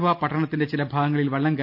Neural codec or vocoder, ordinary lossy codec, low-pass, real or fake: none; none; 5.4 kHz; real